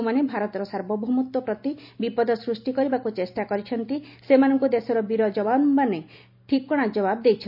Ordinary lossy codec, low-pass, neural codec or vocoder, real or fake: none; 5.4 kHz; none; real